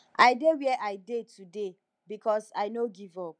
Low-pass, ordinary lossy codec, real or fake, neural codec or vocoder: 9.9 kHz; none; real; none